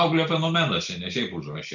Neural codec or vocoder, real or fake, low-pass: none; real; 7.2 kHz